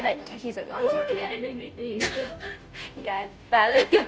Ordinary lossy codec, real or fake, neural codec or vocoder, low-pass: none; fake; codec, 16 kHz, 0.5 kbps, FunCodec, trained on Chinese and English, 25 frames a second; none